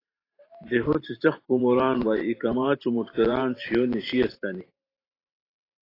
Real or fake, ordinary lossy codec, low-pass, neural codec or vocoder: fake; AAC, 24 kbps; 5.4 kHz; vocoder, 44.1 kHz, 128 mel bands every 512 samples, BigVGAN v2